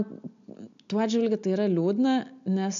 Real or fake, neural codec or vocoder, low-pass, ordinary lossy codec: real; none; 7.2 kHz; AAC, 96 kbps